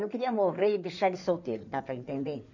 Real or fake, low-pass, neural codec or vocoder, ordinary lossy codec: fake; 7.2 kHz; codec, 16 kHz in and 24 kHz out, 2.2 kbps, FireRedTTS-2 codec; none